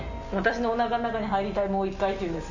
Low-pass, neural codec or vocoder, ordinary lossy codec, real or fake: 7.2 kHz; none; none; real